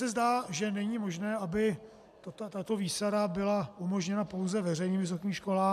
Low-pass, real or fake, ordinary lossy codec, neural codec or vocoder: 14.4 kHz; real; MP3, 96 kbps; none